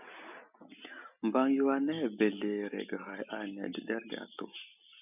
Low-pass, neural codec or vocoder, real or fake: 3.6 kHz; none; real